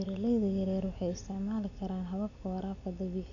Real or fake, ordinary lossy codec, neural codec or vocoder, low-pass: real; none; none; 7.2 kHz